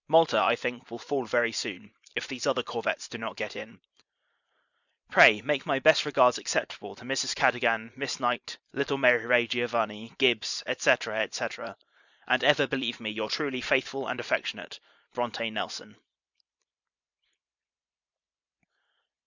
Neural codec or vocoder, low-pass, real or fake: vocoder, 44.1 kHz, 128 mel bands every 512 samples, BigVGAN v2; 7.2 kHz; fake